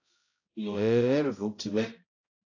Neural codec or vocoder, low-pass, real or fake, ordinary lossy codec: codec, 16 kHz, 0.5 kbps, X-Codec, HuBERT features, trained on balanced general audio; 7.2 kHz; fake; AAC, 32 kbps